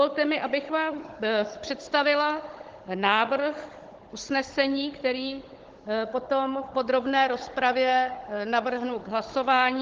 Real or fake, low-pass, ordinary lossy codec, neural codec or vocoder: fake; 7.2 kHz; Opus, 16 kbps; codec, 16 kHz, 16 kbps, FunCodec, trained on Chinese and English, 50 frames a second